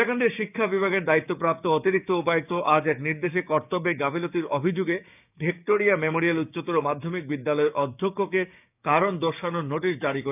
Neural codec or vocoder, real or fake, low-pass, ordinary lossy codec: codec, 16 kHz, 6 kbps, DAC; fake; 3.6 kHz; none